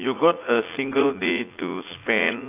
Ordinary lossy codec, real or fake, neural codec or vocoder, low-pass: AAC, 24 kbps; fake; vocoder, 44.1 kHz, 80 mel bands, Vocos; 3.6 kHz